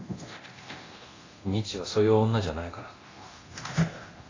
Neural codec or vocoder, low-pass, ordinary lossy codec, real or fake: codec, 24 kHz, 0.9 kbps, DualCodec; 7.2 kHz; AAC, 32 kbps; fake